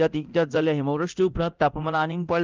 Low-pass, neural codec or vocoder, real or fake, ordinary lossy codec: 7.2 kHz; codec, 24 kHz, 0.9 kbps, DualCodec; fake; Opus, 32 kbps